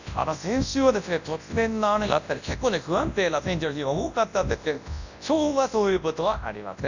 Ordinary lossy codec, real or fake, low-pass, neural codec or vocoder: none; fake; 7.2 kHz; codec, 24 kHz, 0.9 kbps, WavTokenizer, large speech release